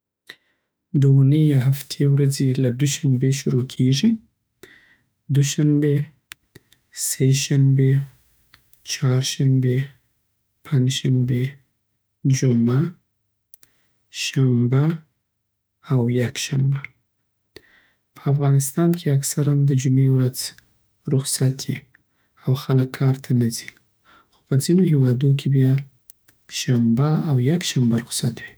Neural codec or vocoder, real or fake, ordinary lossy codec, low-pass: autoencoder, 48 kHz, 32 numbers a frame, DAC-VAE, trained on Japanese speech; fake; none; none